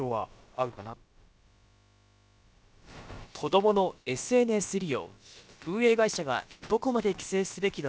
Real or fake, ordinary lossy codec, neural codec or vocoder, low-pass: fake; none; codec, 16 kHz, about 1 kbps, DyCAST, with the encoder's durations; none